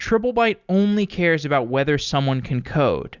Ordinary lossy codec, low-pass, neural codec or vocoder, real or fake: Opus, 64 kbps; 7.2 kHz; none; real